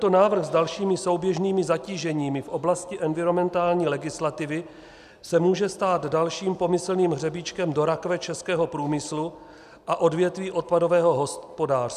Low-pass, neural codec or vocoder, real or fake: 14.4 kHz; none; real